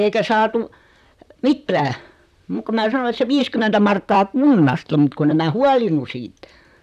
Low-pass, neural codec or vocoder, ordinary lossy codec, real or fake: 14.4 kHz; vocoder, 44.1 kHz, 128 mel bands, Pupu-Vocoder; none; fake